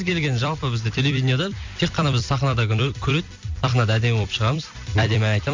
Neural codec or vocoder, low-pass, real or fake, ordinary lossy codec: vocoder, 44.1 kHz, 128 mel bands every 256 samples, BigVGAN v2; 7.2 kHz; fake; MP3, 64 kbps